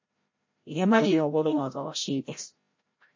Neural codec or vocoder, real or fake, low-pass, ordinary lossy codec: codec, 16 kHz, 0.5 kbps, FreqCodec, larger model; fake; 7.2 kHz; MP3, 32 kbps